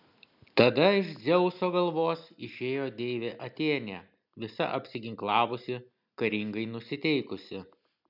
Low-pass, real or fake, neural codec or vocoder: 5.4 kHz; real; none